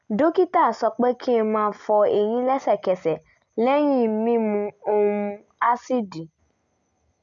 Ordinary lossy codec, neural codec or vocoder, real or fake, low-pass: MP3, 96 kbps; none; real; 7.2 kHz